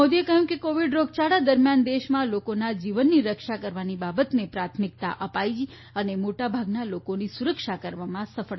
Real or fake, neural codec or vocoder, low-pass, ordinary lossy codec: real; none; 7.2 kHz; MP3, 24 kbps